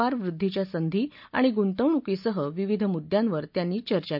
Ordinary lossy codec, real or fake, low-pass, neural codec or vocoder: none; real; 5.4 kHz; none